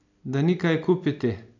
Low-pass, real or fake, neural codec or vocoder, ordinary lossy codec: 7.2 kHz; real; none; none